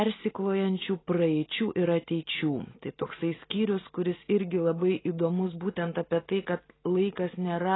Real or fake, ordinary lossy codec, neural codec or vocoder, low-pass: real; AAC, 16 kbps; none; 7.2 kHz